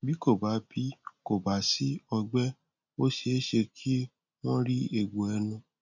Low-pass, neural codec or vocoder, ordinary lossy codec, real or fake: 7.2 kHz; none; none; real